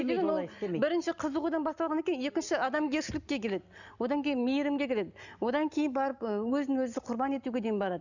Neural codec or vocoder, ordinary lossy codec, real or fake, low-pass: none; none; real; 7.2 kHz